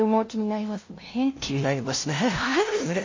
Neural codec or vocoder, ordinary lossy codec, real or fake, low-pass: codec, 16 kHz, 0.5 kbps, FunCodec, trained on LibriTTS, 25 frames a second; MP3, 32 kbps; fake; 7.2 kHz